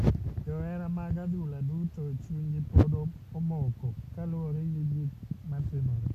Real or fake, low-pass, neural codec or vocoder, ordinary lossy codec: real; 14.4 kHz; none; AAC, 64 kbps